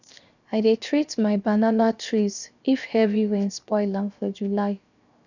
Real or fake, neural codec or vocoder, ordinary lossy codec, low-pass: fake; codec, 16 kHz, 0.7 kbps, FocalCodec; none; 7.2 kHz